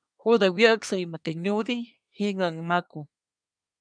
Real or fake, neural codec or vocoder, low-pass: fake; codec, 24 kHz, 1 kbps, SNAC; 9.9 kHz